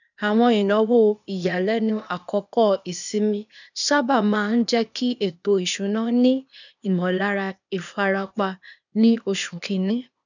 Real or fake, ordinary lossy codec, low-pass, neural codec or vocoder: fake; none; 7.2 kHz; codec, 16 kHz, 0.8 kbps, ZipCodec